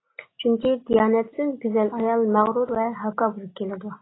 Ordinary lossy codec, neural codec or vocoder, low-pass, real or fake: AAC, 16 kbps; none; 7.2 kHz; real